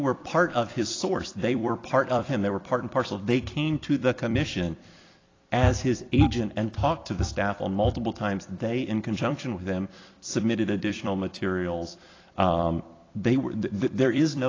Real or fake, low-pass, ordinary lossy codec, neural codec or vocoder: fake; 7.2 kHz; AAC, 32 kbps; vocoder, 44.1 kHz, 128 mel bands every 256 samples, BigVGAN v2